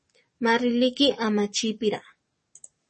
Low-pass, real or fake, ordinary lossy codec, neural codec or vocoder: 10.8 kHz; fake; MP3, 32 kbps; codec, 44.1 kHz, 7.8 kbps, DAC